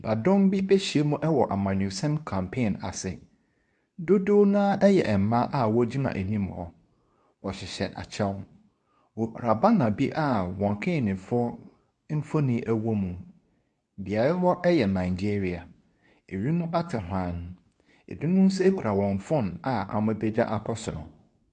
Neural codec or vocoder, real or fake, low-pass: codec, 24 kHz, 0.9 kbps, WavTokenizer, medium speech release version 2; fake; 10.8 kHz